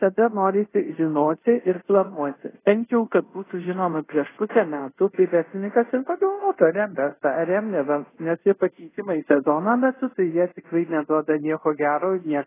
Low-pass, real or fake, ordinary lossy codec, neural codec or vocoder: 3.6 kHz; fake; AAC, 16 kbps; codec, 24 kHz, 0.5 kbps, DualCodec